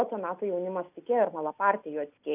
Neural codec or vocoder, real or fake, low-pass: none; real; 3.6 kHz